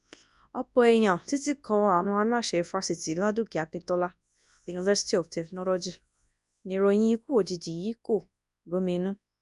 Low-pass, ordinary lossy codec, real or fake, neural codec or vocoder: 10.8 kHz; none; fake; codec, 24 kHz, 0.9 kbps, WavTokenizer, large speech release